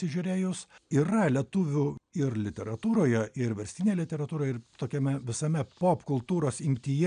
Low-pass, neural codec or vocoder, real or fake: 9.9 kHz; none; real